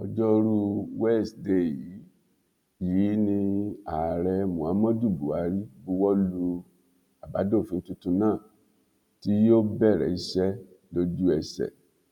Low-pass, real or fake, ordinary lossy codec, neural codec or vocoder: 19.8 kHz; real; none; none